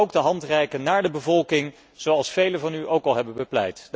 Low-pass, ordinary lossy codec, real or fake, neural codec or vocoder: none; none; real; none